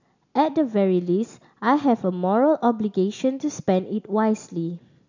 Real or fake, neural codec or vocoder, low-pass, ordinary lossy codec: real; none; 7.2 kHz; none